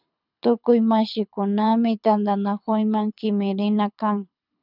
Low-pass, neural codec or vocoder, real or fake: 5.4 kHz; codec, 24 kHz, 6 kbps, HILCodec; fake